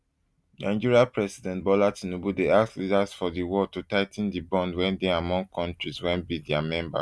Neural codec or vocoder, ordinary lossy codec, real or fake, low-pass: none; none; real; none